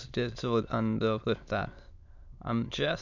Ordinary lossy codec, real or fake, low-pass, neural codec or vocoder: none; fake; 7.2 kHz; autoencoder, 22.05 kHz, a latent of 192 numbers a frame, VITS, trained on many speakers